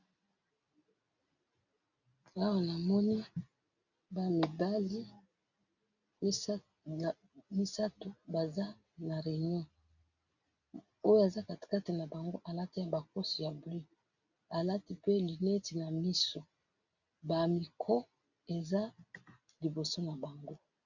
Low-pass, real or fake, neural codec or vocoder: 7.2 kHz; real; none